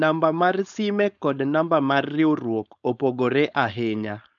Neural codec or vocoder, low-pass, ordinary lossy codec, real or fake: codec, 16 kHz, 4.8 kbps, FACodec; 7.2 kHz; none; fake